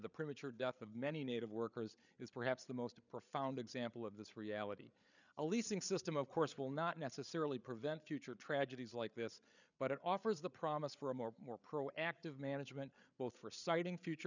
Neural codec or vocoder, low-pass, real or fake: codec, 16 kHz, 8 kbps, FreqCodec, larger model; 7.2 kHz; fake